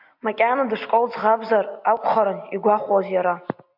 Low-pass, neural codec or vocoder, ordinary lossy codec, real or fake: 5.4 kHz; none; MP3, 48 kbps; real